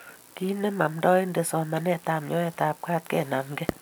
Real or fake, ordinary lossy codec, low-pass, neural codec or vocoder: real; none; none; none